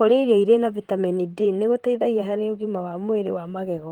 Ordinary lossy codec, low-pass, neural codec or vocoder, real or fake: Opus, 24 kbps; 19.8 kHz; vocoder, 44.1 kHz, 128 mel bands, Pupu-Vocoder; fake